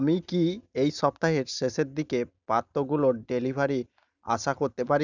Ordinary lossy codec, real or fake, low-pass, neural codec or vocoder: none; real; 7.2 kHz; none